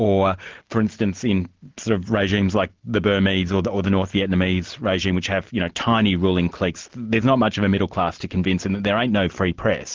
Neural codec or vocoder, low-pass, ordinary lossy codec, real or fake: none; 7.2 kHz; Opus, 16 kbps; real